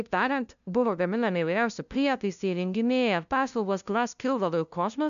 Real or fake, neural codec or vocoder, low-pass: fake; codec, 16 kHz, 0.5 kbps, FunCodec, trained on LibriTTS, 25 frames a second; 7.2 kHz